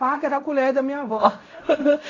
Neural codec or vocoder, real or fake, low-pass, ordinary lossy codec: codec, 16 kHz in and 24 kHz out, 0.4 kbps, LongCat-Audio-Codec, fine tuned four codebook decoder; fake; 7.2 kHz; AAC, 32 kbps